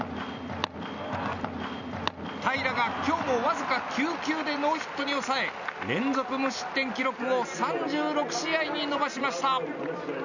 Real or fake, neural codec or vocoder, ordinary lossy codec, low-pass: real; none; none; 7.2 kHz